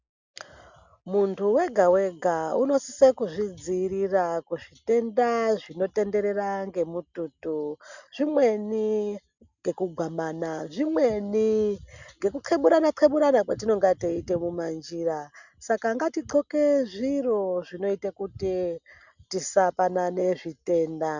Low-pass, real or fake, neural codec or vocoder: 7.2 kHz; real; none